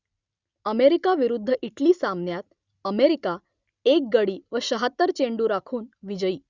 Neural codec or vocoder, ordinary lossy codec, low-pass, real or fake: none; Opus, 64 kbps; 7.2 kHz; real